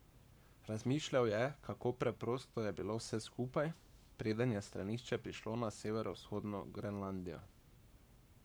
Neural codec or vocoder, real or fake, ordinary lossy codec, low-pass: codec, 44.1 kHz, 7.8 kbps, Pupu-Codec; fake; none; none